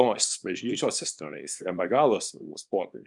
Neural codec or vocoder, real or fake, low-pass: codec, 24 kHz, 0.9 kbps, WavTokenizer, small release; fake; 10.8 kHz